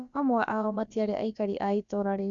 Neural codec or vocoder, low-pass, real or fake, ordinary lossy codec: codec, 16 kHz, about 1 kbps, DyCAST, with the encoder's durations; 7.2 kHz; fake; Opus, 64 kbps